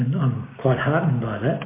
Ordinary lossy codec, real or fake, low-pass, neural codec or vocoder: MP3, 24 kbps; real; 3.6 kHz; none